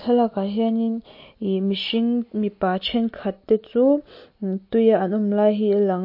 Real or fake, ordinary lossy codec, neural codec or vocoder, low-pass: real; MP3, 32 kbps; none; 5.4 kHz